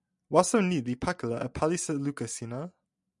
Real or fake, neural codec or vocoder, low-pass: real; none; 10.8 kHz